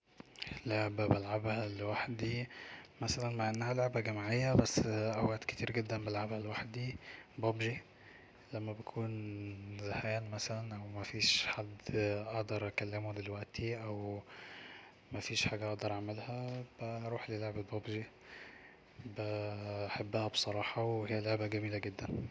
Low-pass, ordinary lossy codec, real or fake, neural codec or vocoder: none; none; real; none